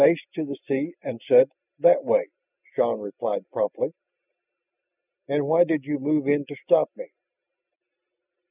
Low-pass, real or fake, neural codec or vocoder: 3.6 kHz; real; none